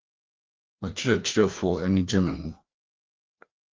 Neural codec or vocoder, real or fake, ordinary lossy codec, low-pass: codec, 16 kHz, 2 kbps, FreqCodec, larger model; fake; Opus, 32 kbps; 7.2 kHz